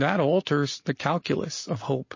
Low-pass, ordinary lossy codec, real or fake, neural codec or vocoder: 7.2 kHz; MP3, 32 kbps; real; none